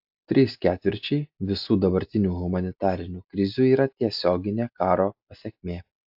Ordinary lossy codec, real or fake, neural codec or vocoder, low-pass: AAC, 48 kbps; real; none; 5.4 kHz